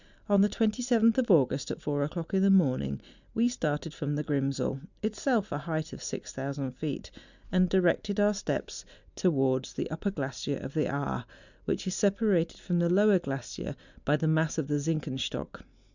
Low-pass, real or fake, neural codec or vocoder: 7.2 kHz; real; none